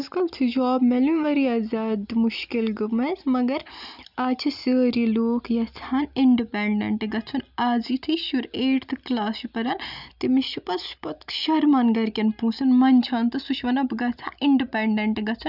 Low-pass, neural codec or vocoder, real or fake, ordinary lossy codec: 5.4 kHz; none; real; none